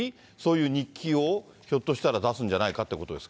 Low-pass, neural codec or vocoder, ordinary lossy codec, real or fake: none; none; none; real